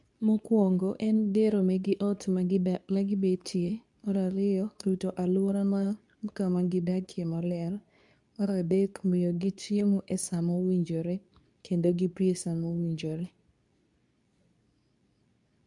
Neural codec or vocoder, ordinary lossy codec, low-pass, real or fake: codec, 24 kHz, 0.9 kbps, WavTokenizer, medium speech release version 2; none; 10.8 kHz; fake